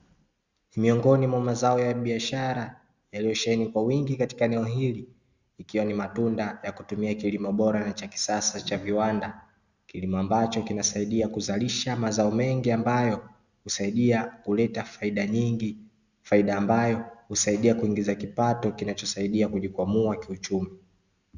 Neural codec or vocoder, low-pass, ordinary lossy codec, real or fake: none; 7.2 kHz; Opus, 64 kbps; real